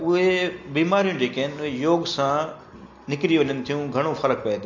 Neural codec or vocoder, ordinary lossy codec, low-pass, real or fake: none; MP3, 48 kbps; 7.2 kHz; real